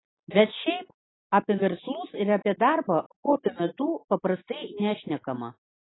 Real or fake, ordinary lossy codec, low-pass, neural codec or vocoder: real; AAC, 16 kbps; 7.2 kHz; none